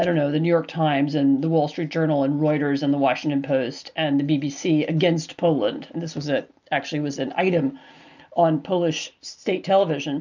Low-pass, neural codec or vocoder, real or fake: 7.2 kHz; none; real